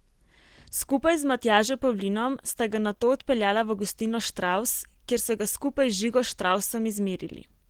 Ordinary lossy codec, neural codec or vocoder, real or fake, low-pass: Opus, 16 kbps; none; real; 19.8 kHz